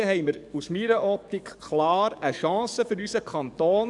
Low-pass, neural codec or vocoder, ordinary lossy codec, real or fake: 10.8 kHz; codec, 44.1 kHz, 7.8 kbps, DAC; none; fake